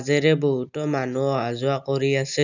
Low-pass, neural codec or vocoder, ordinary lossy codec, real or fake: 7.2 kHz; none; none; real